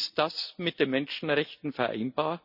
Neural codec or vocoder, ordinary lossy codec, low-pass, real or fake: none; none; 5.4 kHz; real